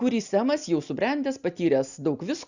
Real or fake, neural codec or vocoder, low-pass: real; none; 7.2 kHz